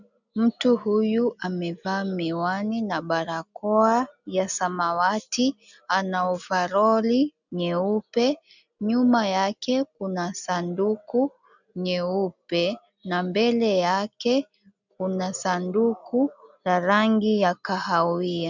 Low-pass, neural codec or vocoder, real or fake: 7.2 kHz; none; real